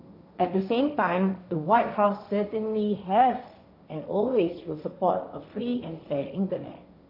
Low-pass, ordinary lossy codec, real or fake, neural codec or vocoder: 5.4 kHz; none; fake; codec, 16 kHz, 1.1 kbps, Voila-Tokenizer